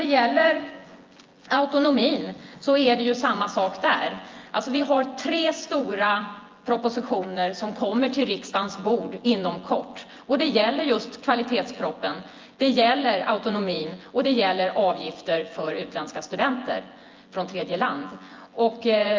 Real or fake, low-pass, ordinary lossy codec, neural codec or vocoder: fake; 7.2 kHz; Opus, 32 kbps; vocoder, 24 kHz, 100 mel bands, Vocos